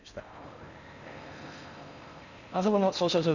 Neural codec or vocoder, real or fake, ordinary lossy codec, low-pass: codec, 16 kHz in and 24 kHz out, 0.6 kbps, FocalCodec, streaming, 4096 codes; fake; none; 7.2 kHz